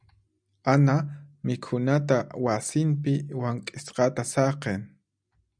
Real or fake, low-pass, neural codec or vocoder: real; 9.9 kHz; none